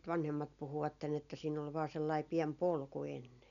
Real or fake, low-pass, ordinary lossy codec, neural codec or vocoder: real; 7.2 kHz; none; none